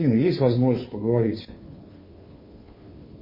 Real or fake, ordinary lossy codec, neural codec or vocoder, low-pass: fake; MP3, 24 kbps; codec, 16 kHz, 2 kbps, FunCodec, trained on Chinese and English, 25 frames a second; 5.4 kHz